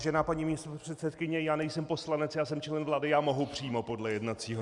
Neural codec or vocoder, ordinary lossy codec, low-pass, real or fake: none; Opus, 64 kbps; 10.8 kHz; real